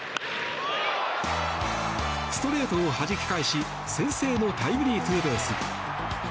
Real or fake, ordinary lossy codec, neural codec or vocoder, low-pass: real; none; none; none